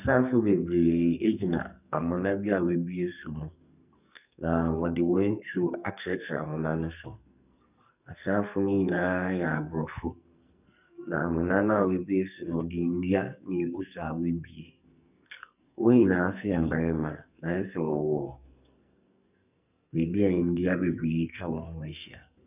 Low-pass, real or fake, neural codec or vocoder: 3.6 kHz; fake; codec, 44.1 kHz, 2.6 kbps, SNAC